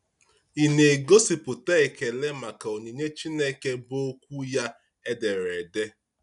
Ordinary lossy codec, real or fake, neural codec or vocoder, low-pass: none; real; none; 10.8 kHz